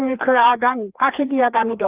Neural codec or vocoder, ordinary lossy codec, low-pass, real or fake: codec, 16 kHz, 2 kbps, FreqCodec, larger model; Opus, 32 kbps; 3.6 kHz; fake